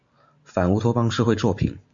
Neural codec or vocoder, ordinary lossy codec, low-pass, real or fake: none; MP3, 48 kbps; 7.2 kHz; real